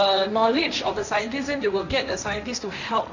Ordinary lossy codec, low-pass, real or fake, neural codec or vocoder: none; 7.2 kHz; fake; codec, 16 kHz, 1.1 kbps, Voila-Tokenizer